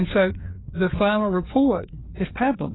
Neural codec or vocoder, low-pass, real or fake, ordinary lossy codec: codec, 16 kHz, 2 kbps, FreqCodec, larger model; 7.2 kHz; fake; AAC, 16 kbps